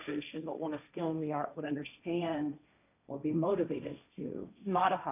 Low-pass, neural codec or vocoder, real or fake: 3.6 kHz; codec, 16 kHz, 1.1 kbps, Voila-Tokenizer; fake